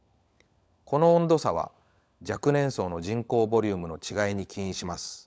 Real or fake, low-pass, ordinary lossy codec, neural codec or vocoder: fake; none; none; codec, 16 kHz, 16 kbps, FunCodec, trained on LibriTTS, 50 frames a second